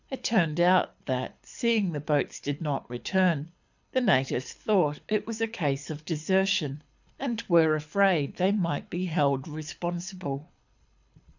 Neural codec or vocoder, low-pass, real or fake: codec, 24 kHz, 6 kbps, HILCodec; 7.2 kHz; fake